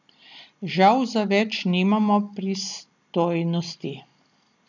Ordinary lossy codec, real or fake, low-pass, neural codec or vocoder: none; real; 7.2 kHz; none